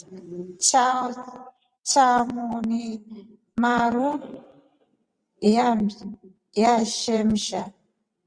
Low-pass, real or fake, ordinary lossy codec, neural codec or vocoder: 9.9 kHz; fake; Opus, 64 kbps; vocoder, 22.05 kHz, 80 mel bands, WaveNeXt